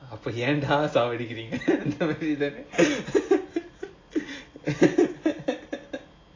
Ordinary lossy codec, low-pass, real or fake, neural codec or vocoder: AAC, 32 kbps; 7.2 kHz; real; none